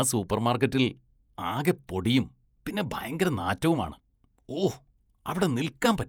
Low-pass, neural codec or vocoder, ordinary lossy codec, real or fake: none; vocoder, 48 kHz, 128 mel bands, Vocos; none; fake